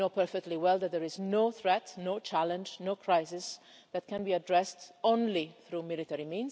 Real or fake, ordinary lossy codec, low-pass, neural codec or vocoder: real; none; none; none